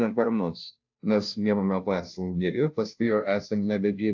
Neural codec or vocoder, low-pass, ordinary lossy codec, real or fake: codec, 16 kHz, 0.5 kbps, FunCodec, trained on Chinese and English, 25 frames a second; 7.2 kHz; AAC, 48 kbps; fake